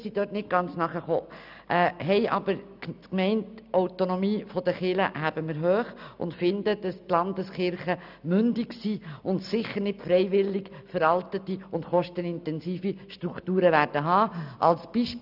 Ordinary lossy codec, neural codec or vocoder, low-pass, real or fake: none; none; 5.4 kHz; real